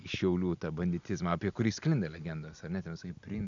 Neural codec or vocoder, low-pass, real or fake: none; 7.2 kHz; real